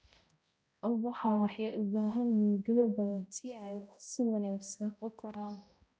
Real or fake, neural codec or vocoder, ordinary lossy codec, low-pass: fake; codec, 16 kHz, 0.5 kbps, X-Codec, HuBERT features, trained on balanced general audio; none; none